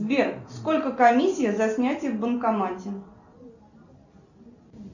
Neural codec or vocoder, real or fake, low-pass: none; real; 7.2 kHz